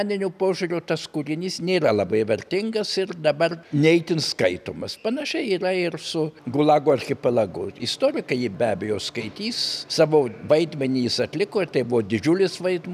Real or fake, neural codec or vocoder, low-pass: real; none; 14.4 kHz